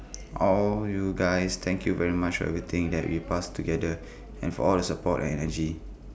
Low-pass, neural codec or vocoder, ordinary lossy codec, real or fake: none; none; none; real